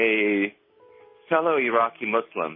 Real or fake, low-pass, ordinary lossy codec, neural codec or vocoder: real; 5.4 kHz; MP3, 24 kbps; none